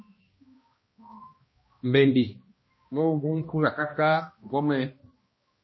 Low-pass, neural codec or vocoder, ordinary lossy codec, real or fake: 7.2 kHz; codec, 16 kHz, 1 kbps, X-Codec, HuBERT features, trained on balanced general audio; MP3, 24 kbps; fake